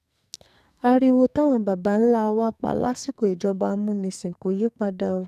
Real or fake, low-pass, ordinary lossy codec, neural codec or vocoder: fake; 14.4 kHz; none; codec, 44.1 kHz, 2.6 kbps, SNAC